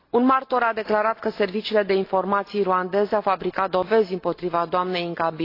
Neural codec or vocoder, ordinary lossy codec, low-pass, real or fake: none; AAC, 32 kbps; 5.4 kHz; real